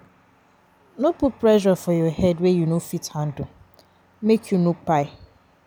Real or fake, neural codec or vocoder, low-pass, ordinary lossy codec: real; none; none; none